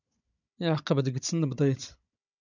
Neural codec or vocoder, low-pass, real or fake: codec, 16 kHz, 16 kbps, FunCodec, trained on Chinese and English, 50 frames a second; 7.2 kHz; fake